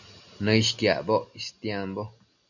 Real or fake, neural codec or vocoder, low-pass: real; none; 7.2 kHz